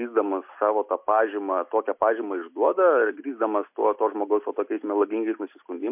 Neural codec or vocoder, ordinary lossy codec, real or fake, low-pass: none; MP3, 32 kbps; real; 3.6 kHz